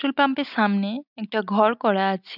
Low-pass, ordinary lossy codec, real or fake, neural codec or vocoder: 5.4 kHz; none; real; none